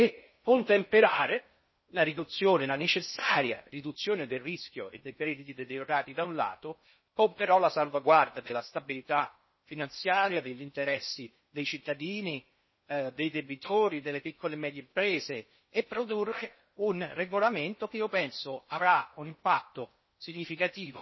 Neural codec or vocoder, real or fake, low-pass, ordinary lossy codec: codec, 16 kHz in and 24 kHz out, 0.6 kbps, FocalCodec, streaming, 4096 codes; fake; 7.2 kHz; MP3, 24 kbps